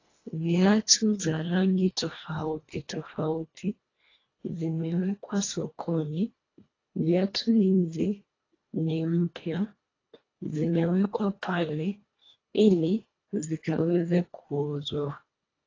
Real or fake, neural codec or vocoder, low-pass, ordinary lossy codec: fake; codec, 24 kHz, 1.5 kbps, HILCodec; 7.2 kHz; AAC, 32 kbps